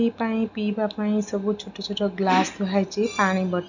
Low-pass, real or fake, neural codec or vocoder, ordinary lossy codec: 7.2 kHz; real; none; none